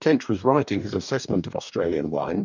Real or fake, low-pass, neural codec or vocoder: fake; 7.2 kHz; codec, 44.1 kHz, 2.6 kbps, DAC